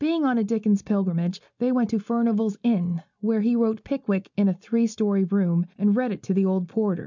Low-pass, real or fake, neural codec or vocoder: 7.2 kHz; real; none